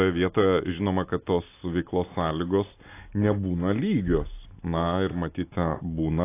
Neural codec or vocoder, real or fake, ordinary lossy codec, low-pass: none; real; AAC, 24 kbps; 3.6 kHz